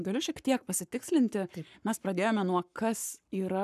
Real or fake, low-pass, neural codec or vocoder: fake; 14.4 kHz; codec, 44.1 kHz, 7.8 kbps, Pupu-Codec